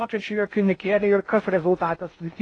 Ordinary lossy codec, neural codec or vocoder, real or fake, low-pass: AAC, 32 kbps; codec, 16 kHz in and 24 kHz out, 0.6 kbps, FocalCodec, streaming, 2048 codes; fake; 9.9 kHz